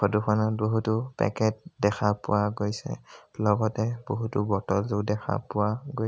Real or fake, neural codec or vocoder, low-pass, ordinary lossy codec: real; none; none; none